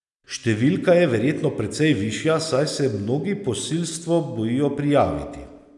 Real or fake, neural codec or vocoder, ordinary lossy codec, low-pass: real; none; none; 10.8 kHz